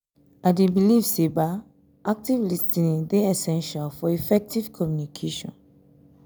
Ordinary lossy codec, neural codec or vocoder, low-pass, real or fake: none; none; none; real